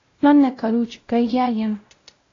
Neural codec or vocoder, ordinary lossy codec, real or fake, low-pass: codec, 16 kHz, 0.5 kbps, X-Codec, WavLM features, trained on Multilingual LibriSpeech; AAC, 32 kbps; fake; 7.2 kHz